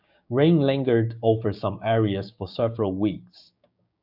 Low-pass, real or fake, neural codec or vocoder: 5.4 kHz; fake; codec, 16 kHz, 6 kbps, DAC